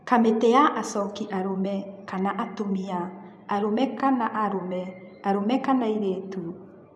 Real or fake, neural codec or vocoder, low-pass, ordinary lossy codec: fake; vocoder, 24 kHz, 100 mel bands, Vocos; none; none